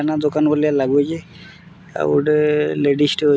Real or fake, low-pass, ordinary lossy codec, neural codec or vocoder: real; none; none; none